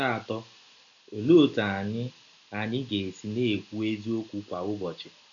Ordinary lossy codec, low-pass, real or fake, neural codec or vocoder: none; 7.2 kHz; real; none